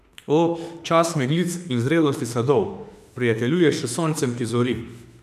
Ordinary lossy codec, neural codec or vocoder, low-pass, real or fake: none; autoencoder, 48 kHz, 32 numbers a frame, DAC-VAE, trained on Japanese speech; 14.4 kHz; fake